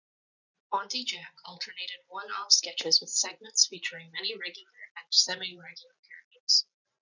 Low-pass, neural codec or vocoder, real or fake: 7.2 kHz; none; real